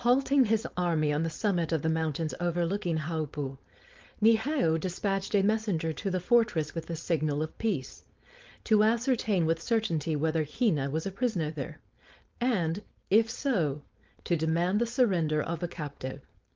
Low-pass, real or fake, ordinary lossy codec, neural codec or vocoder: 7.2 kHz; fake; Opus, 32 kbps; codec, 16 kHz, 4.8 kbps, FACodec